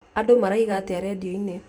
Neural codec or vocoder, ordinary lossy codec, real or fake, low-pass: vocoder, 44.1 kHz, 128 mel bands every 512 samples, BigVGAN v2; none; fake; 19.8 kHz